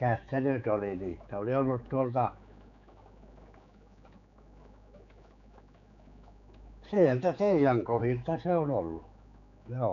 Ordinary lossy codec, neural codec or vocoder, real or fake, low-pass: none; codec, 16 kHz, 4 kbps, X-Codec, HuBERT features, trained on general audio; fake; 7.2 kHz